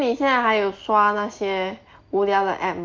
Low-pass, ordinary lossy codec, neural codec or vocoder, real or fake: 7.2 kHz; Opus, 24 kbps; none; real